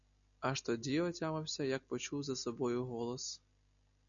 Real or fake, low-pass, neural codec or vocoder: real; 7.2 kHz; none